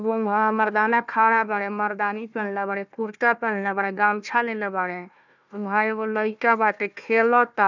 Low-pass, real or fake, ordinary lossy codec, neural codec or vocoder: 7.2 kHz; fake; none; codec, 16 kHz, 1 kbps, FunCodec, trained on Chinese and English, 50 frames a second